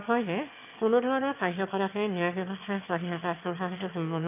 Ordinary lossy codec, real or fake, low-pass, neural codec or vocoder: none; fake; 3.6 kHz; autoencoder, 22.05 kHz, a latent of 192 numbers a frame, VITS, trained on one speaker